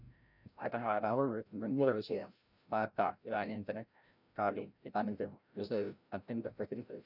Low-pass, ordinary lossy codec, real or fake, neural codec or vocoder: 5.4 kHz; none; fake; codec, 16 kHz, 0.5 kbps, FreqCodec, larger model